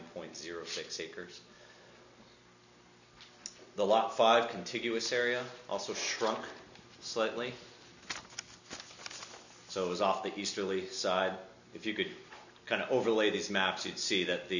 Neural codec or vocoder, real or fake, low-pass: none; real; 7.2 kHz